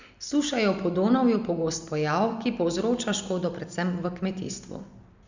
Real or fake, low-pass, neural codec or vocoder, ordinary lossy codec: real; 7.2 kHz; none; Opus, 64 kbps